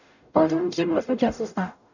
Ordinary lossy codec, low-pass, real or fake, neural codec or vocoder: none; 7.2 kHz; fake; codec, 44.1 kHz, 0.9 kbps, DAC